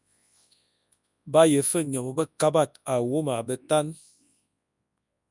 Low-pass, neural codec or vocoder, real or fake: 10.8 kHz; codec, 24 kHz, 0.9 kbps, WavTokenizer, large speech release; fake